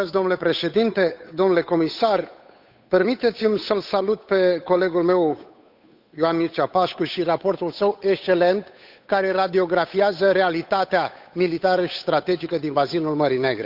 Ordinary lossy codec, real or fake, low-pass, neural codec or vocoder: none; fake; 5.4 kHz; codec, 16 kHz, 8 kbps, FunCodec, trained on Chinese and English, 25 frames a second